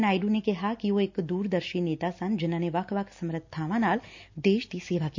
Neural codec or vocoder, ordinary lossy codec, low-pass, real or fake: none; MP3, 48 kbps; 7.2 kHz; real